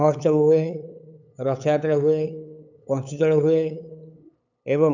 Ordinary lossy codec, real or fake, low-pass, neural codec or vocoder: none; fake; 7.2 kHz; codec, 16 kHz, 8 kbps, FunCodec, trained on LibriTTS, 25 frames a second